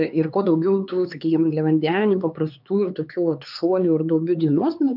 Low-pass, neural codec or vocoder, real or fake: 5.4 kHz; codec, 16 kHz, 4 kbps, X-Codec, HuBERT features, trained on LibriSpeech; fake